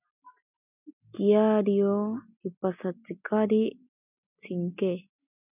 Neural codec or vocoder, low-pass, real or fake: none; 3.6 kHz; real